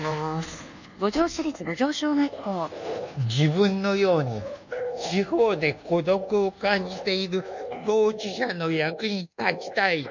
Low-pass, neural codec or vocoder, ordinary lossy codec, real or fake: 7.2 kHz; codec, 24 kHz, 1.2 kbps, DualCodec; none; fake